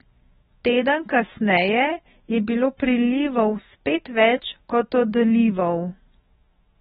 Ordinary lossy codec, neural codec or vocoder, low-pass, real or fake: AAC, 16 kbps; autoencoder, 48 kHz, 128 numbers a frame, DAC-VAE, trained on Japanese speech; 19.8 kHz; fake